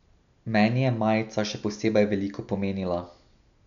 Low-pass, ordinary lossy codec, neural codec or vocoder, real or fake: 7.2 kHz; none; none; real